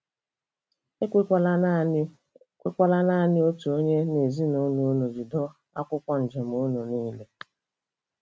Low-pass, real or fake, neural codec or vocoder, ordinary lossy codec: none; real; none; none